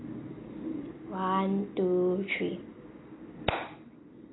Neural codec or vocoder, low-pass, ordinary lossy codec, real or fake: none; 7.2 kHz; AAC, 16 kbps; real